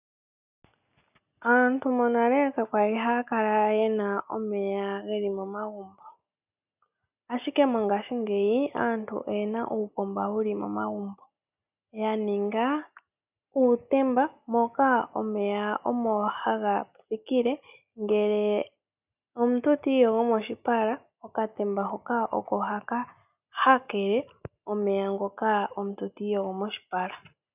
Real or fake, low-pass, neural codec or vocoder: real; 3.6 kHz; none